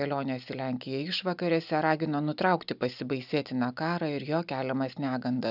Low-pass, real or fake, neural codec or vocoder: 5.4 kHz; real; none